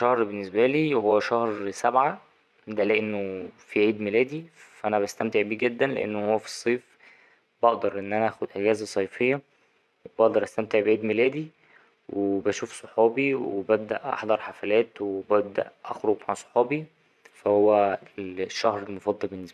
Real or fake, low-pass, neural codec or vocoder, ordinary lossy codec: fake; none; vocoder, 24 kHz, 100 mel bands, Vocos; none